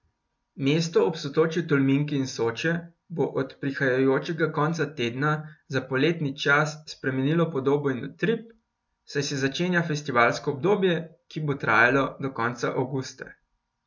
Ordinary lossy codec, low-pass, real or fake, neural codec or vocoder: MP3, 64 kbps; 7.2 kHz; real; none